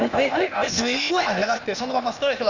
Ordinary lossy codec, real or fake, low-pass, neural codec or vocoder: none; fake; 7.2 kHz; codec, 16 kHz, 0.8 kbps, ZipCodec